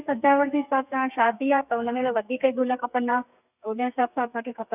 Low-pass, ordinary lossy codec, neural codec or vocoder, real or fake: 3.6 kHz; none; codec, 32 kHz, 1.9 kbps, SNAC; fake